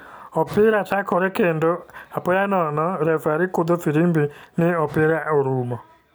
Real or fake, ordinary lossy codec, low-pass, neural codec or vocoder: real; none; none; none